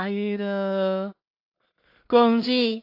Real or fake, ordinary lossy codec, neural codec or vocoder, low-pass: fake; AAC, 32 kbps; codec, 16 kHz in and 24 kHz out, 0.4 kbps, LongCat-Audio-Codec, two codebook decoder; 5.4 kHz